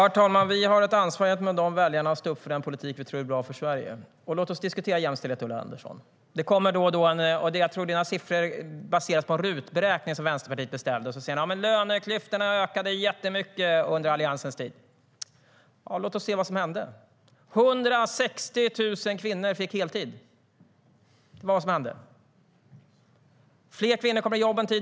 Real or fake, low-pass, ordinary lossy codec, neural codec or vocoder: real; none; none; none